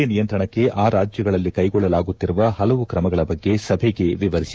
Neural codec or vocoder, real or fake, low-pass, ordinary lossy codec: codec, 16 kHz, 6 kbps, DAC; fake; none; none